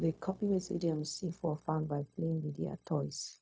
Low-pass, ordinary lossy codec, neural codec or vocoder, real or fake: none; none; codec, 16 kHz, 0.4 kbps, LongCat-Audio-Codec; fake